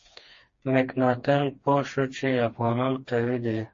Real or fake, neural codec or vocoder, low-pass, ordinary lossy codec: fake; codec, 16 kHz, 2 kbps, FreqCodec, smaller model; 7.2 kHz; MP3, 32 kbps